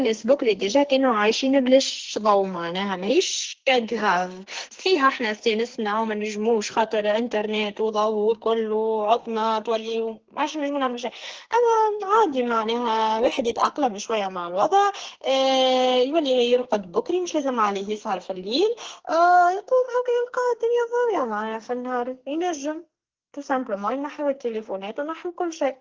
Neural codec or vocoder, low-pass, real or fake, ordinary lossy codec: codec, 44.1 kHz, 2.6 kbps, SNAC; 7.2 kHz; fake; Opus, 16 kbps